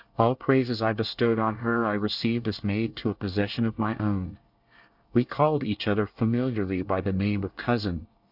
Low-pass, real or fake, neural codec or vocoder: 5.4 kHz; fake; codec, 24 kHz, 1 kbps, SNAC